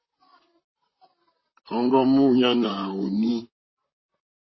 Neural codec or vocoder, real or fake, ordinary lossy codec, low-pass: vocoder, 44.1 kHz, 128 mel bands, Pupu-Vocoder; fake; MP3, 24 kbps; 7.2 kHz